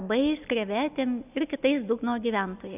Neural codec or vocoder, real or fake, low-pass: none; real; 3.6 kHz